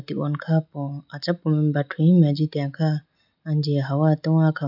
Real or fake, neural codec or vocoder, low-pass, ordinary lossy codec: real; none; 5.4 kHz; none